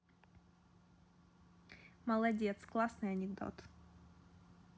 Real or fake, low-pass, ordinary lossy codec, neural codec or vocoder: real; none; none; none